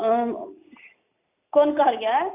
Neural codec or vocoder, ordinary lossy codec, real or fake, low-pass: none; AAC, 24 kbps; real; 3.6 kHz